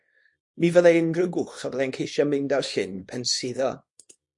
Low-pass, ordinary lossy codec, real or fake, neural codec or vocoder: 10.8 kHz; MP3, 48 kbps; fake; codec, 24 kHz, 0.9 kbps, WavTokenizer, small release